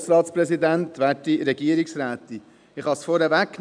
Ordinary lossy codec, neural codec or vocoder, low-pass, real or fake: none; none; 9.9 kHz; real